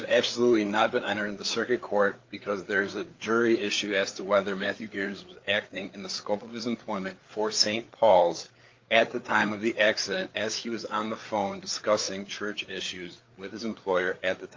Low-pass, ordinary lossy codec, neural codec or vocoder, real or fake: 7.2 kHz; Opus, 32 kbps; codec, 16 kHz, 4 kbps, FreqCodec, larger model; fake